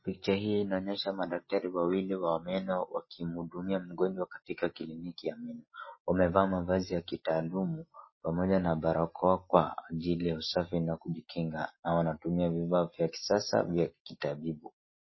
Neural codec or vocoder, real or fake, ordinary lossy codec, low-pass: none; real; MP3, 24 kbps; 7.2 kHz